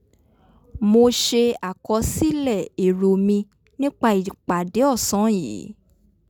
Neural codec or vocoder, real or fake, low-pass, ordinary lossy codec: none; real; none; none